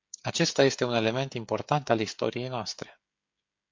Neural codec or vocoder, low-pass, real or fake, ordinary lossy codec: codec, 16 kHz, 16 kbps, FreqCodec, smaller model; 7.2 kHz; fake; MP3, 48 kbps